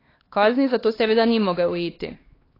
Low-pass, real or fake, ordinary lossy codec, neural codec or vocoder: 5.4 kHz; fake; AAC, 24 kbps; codec, 16 kHz, 2 kbps, X-Codec, HuBERT features, trained on LibriSpeech